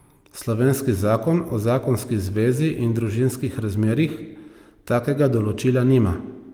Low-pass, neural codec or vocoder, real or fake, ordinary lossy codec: 19.8 kHz; none; real; Opus, 32 kbps